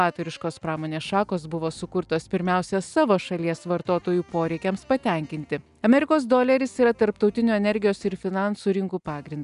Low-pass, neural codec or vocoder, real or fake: 10.8 kHz; none; real